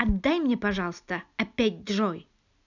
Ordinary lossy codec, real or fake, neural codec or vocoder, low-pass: none; real; none; 7.2 kHz